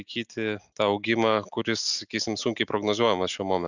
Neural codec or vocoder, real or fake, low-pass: none; real; 7.2 kHz